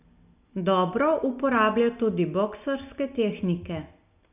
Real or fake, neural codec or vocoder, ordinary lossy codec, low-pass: real; none; none; 3.6 kHz